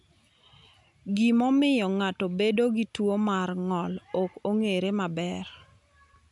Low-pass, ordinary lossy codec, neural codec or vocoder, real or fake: 10.8 kHz; none; none; real